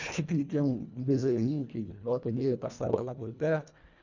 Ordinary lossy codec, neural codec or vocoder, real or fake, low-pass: none; codec, 24 kHz, 1.5 kbps, HILCodec; fake; 7.2 kHz